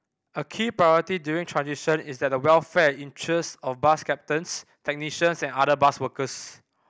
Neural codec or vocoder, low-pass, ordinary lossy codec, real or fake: none; none; none; real